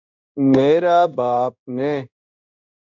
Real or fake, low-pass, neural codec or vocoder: fake; 7.2 kHz; codec, 16 kHz in and 24 kHz out, 1 kbps, XY-Tokenizer